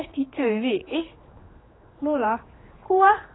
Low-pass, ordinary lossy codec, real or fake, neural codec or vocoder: 7.2 kHz; AAC, 16 kbps; fake; codec, 16 kHz, 2 kbps, X-Codec, HuBERT features, trained on general audio